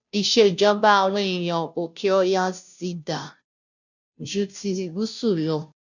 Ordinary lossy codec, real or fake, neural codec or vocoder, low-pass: none; fake; codec, 16 kHz, 0.5 kbps, FunCodec, trained on Chinese and English, 25 frames a second; 7.2 kHz